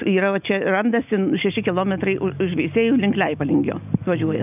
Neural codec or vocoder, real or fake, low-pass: none; real; 3.6 kHz